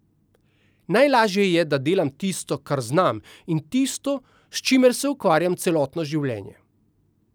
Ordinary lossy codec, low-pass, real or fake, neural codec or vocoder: none; none; real; none